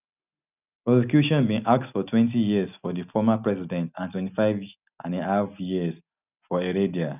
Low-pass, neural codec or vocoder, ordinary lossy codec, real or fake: 3.6 kHz; none; none; real